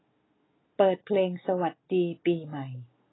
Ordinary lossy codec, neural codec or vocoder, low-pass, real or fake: AAC, 16 kbps; none; 7.2 kHz; real